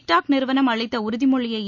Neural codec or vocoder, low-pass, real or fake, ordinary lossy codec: none; 7.2 kHz; real; none